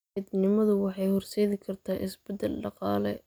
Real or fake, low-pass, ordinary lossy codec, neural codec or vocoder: real; none; none; none